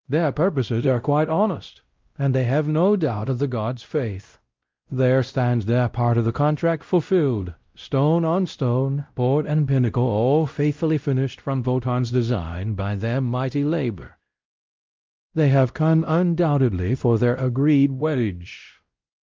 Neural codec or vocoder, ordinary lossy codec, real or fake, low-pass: codec, 16 kHz, 0.5 kbps, X-Codec, WavLM features, trained on Multilingual LibriSpeech; Opus, 24 kbps; fake; 7.2 kHz